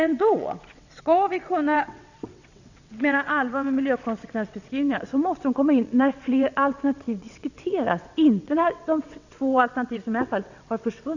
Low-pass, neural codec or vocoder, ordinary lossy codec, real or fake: 7.2 kHz; vocoder, 22.05 kHz, 80 mel bands, WaveNeXt; none; fake